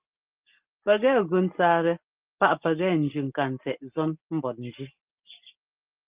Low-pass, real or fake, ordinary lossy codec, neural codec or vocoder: 3.6 kHz; real; Opus, 32 kbps; none